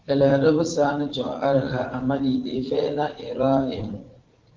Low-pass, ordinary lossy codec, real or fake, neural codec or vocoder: 7.2 kHz; Opus, 16 kbps; fake; vocoder, 44.1 kHz, 80 mel bands, Vocos